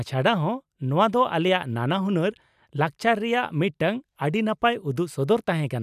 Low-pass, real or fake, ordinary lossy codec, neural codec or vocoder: 14.4 kHz; real; none; none